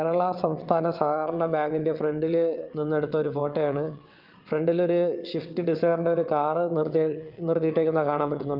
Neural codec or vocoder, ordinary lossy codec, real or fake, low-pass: vocoder, 22.05 kHz, 80 mel bands, WaveNeXt; Opus, 24 kbps; fake; 5.4 kHz